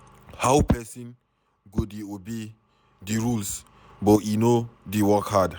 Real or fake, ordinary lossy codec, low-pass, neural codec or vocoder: real; none; none; none